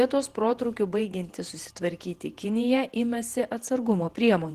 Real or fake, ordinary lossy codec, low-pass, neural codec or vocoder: fake; Opus, 16 kbps; 14.4 kHz; vocoder, 48 kHz, 128 mel bands, Vocos